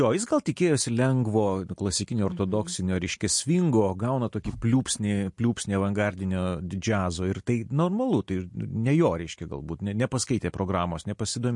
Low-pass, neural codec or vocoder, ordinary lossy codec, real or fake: 10.8 kHz; none; MP3, 48 kbps; real